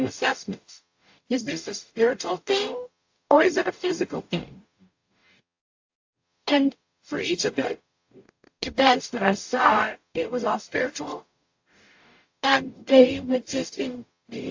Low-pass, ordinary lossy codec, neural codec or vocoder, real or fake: 7.2 kHz; AAC, 48 kbps; codec, 44.1 kHz, 0.9 kbps, DAC; fake